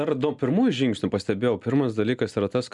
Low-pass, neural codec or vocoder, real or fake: 10.8 kHz; none; real